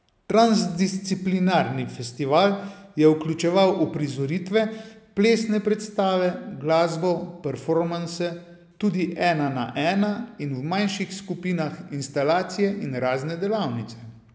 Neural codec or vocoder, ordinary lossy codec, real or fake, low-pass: none; none; real; none